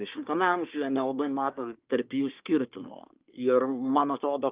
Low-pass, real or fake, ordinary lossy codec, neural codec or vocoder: 3.6 kHz; fake; Opus, 24 kbps; codec, 24 kHz, 1 kbps, SNAC